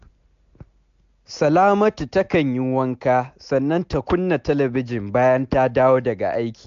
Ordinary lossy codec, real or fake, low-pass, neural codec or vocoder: AAC, 64 kbps; real; 7.2 kHz; none